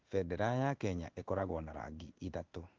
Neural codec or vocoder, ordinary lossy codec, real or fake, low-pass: codec, 16 kHz in and 24 kHz out, 1 kbps, XY-Tokenizer; Opus, 24 kbps; fake; 7.2 kHz